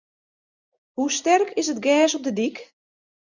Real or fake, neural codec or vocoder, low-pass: real; none; 7.2 kHz